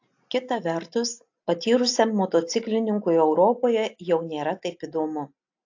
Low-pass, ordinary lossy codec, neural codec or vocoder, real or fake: 7.2 kHz; AAC, 48 kbps; none; real